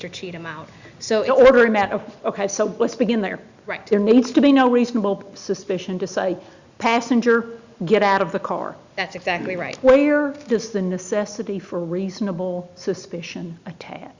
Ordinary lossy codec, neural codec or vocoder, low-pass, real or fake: Opus, 64 kbps; none; 7.2 kHz; real